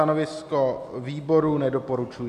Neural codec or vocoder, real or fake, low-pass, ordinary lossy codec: none; real; 14.4 kHz; MP3, 96 kbps